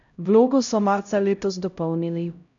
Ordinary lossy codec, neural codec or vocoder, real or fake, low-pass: none; codec, 16 kHz, 0.5 kbps, X-Codec, HuBERT features, trained on LibriSpeech; fake; 7.2 kHz